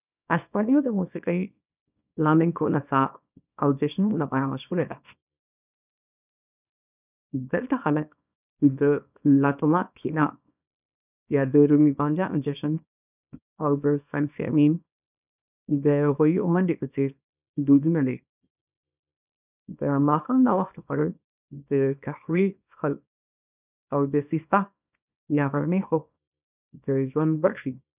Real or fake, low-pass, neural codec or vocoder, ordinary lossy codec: fake; 3.6 kHz; codec, 24 kHz, 0.9 kbps, WavTokenizer, small release; none